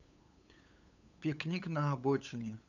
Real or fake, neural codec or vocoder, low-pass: fake; codec, 16 kHz, 8 kbps, FunCodec, trained on LibriTTS, 25 frames a second; 7.2 kHz